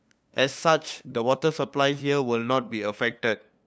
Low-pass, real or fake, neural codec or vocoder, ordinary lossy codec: none; fake; codec, 16 kHz, 2 kbps, FunCodec, trained on LibriTTS, 25 frames a second; none